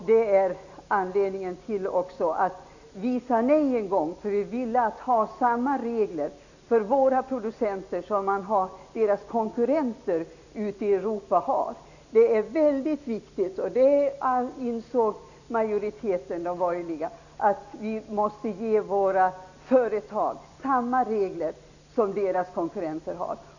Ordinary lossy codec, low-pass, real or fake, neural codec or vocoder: none; 7.2 kHz; real; none